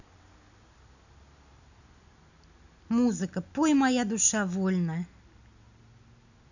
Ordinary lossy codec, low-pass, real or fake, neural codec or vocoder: none; 7.2 kHz; real; none